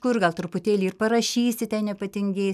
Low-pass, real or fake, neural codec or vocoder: 14.4 kHz; real; none